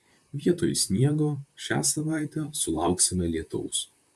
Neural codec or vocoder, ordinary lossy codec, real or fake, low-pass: vocoder, 44.1 kHz, 128 mel bands, Pupu-Vocoder; AAC, 96 kbps; fake; 14.4 kHz